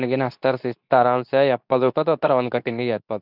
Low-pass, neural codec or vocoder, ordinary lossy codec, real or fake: 5.4 kHz; codec, 24 kHz, 0.9 kbps, WavTokenizer, medium speech release version 2; none; fake